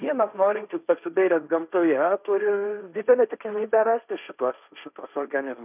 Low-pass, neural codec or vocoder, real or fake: 3.6 kHz; codec, 16 kHz, 1.1 kbps, Voila-Tokenizer; fake